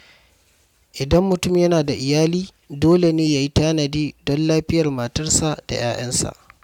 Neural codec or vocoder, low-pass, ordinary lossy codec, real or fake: none; 19.8 kHz; none; real